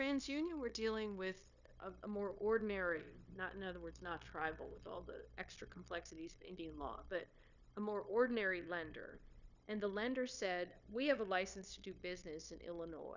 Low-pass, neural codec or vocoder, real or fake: 7.2 kHz; codec, 16 kHz, 0.9 kbps, LongCat-Audio-Codec; fake